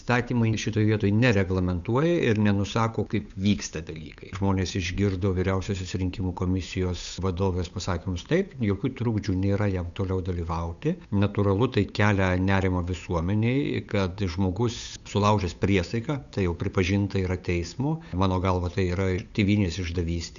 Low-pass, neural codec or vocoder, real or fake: 7.2 kHz; codec, 16 kHz, 8 kbps, FunCodec, trained on Chinese and English, 25 frames a second; fake